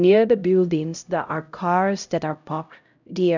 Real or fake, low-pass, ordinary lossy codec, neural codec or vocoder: fake; 7.2 kHz; none; codec, 16 kHz, 0.5 kbps, X-Codec, HuBERT features, trained on LibriSpeech